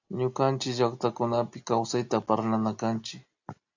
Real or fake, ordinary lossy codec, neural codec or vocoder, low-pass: real; AAC, 48 kbps; none; 7.2 kHz